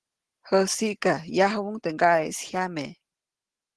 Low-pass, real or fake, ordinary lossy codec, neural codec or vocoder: 10.8 kHz; real; Opus, 16 kbps; none